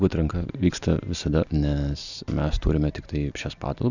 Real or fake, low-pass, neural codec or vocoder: real; 7.2 kHz; none